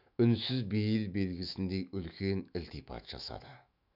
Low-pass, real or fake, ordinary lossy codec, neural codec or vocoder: 5.4 kHz; fake; none; autoencoder, 48 kHz, 128 numbers a frame, DAC-VAE, trained on Japanese speech